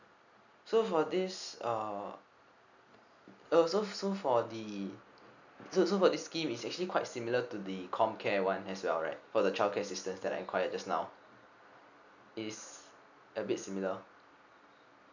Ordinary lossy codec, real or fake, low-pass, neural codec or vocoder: none; real; 7.2 kHz; none